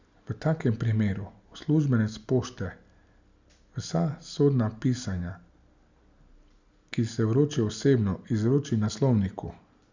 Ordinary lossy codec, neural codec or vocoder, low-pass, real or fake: Opus, 64 kbps; none; 7.2 kHz; real